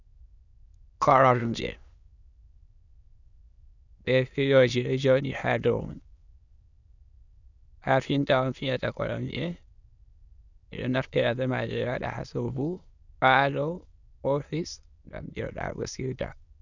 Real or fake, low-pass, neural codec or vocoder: fake; 7.2 kHz; autoencoder, 22.05 kHz, a latent of 192 numbers a frame, VITS, trained on many speakers